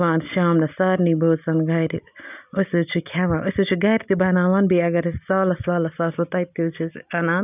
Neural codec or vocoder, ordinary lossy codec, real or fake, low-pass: none; none; real; 3.6 kHz